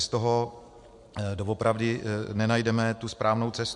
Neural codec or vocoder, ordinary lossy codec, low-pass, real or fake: none; MP3, 64 kbps; 10.8 kHz; real